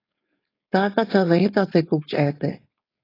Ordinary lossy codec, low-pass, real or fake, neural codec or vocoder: AAC, 24 kbps; 5.4 kHz; fake; codec, 16 kHz, 4.8 kbps, FACodec